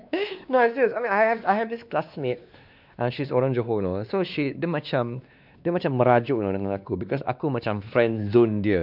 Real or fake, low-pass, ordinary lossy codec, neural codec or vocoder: fake; 5.4 kHz; none; codec, 16 kHz, 2 kbps, X-Codec, WavLM features, trained on Multilingual LibriSpeech